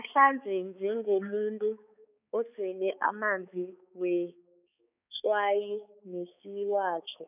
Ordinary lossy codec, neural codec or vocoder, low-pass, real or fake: none; codec, 16 kHz, 4 kbps, X-Codec, HuBERT features, trained on balanced general audio; 3.6 kHz; fake